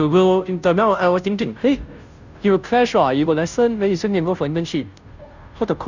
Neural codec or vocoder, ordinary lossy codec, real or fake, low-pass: codec, 16 kHz, 0.5 kbps, FunCodec, trained on Chinese and English, 25 frames a second; none; fake; 7.2 kHz